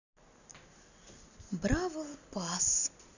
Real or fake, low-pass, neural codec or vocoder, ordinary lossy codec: real; 7.2 kHz; none; none